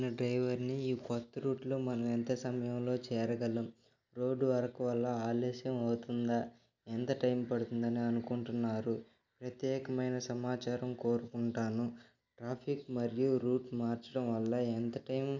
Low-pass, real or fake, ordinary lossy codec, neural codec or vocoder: 7.2 kHz; real; none; none